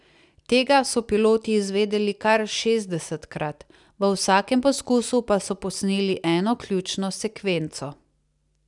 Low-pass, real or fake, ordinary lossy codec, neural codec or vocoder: 10.8 kHz; real; none; none